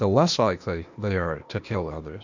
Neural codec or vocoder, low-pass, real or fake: codec, 16 kHz, 0.8 kbps, ZipCodec; 7.2 kHz; fake